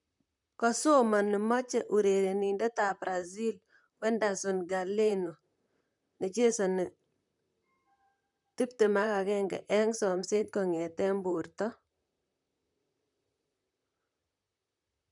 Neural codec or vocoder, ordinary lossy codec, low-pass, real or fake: vocoder, 44.1 kHz, 128 mel bands, Pupu-Vocoder; none; 10.8 kHz; fake